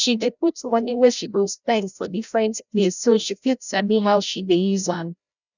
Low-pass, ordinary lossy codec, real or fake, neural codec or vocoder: 7.2 kHz; none; fake; codec, 16 kHz, 0.5 kbps, FreqCodec, larger model